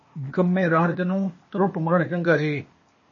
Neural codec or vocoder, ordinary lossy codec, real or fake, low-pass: codec, 16 kHz, 0.8 kbps, ZipCodec; MP3, 32 kbps; fake; 7.2 kHz